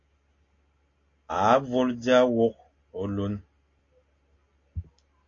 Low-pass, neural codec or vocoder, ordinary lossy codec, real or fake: 7.2 kHz; none; AAC, 32 kbps; real